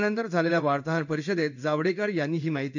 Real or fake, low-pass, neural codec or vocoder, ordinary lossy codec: fake; 7.2 kHz; codec, 16 kHz in and 24 kHz out, 1 kbps, XY-Tokenizer; none